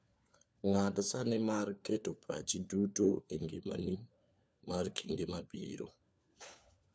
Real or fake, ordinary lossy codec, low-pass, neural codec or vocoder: fake; none; none; codec, 16 kHz, 4 kbps, FunCodec, trained on LibriTTS, 50 frames a second